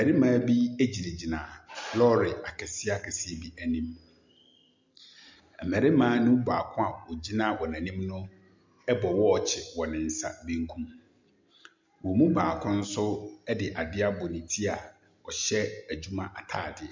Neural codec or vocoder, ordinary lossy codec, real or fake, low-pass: none; MP3, 64 kbps; real; 7.2 kHz